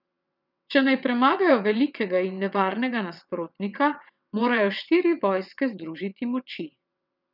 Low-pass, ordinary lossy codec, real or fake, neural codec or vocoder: 5.4 kHz; none; fake; vocoder, 22.05 kHz, 80 mel bands, WaveNeXt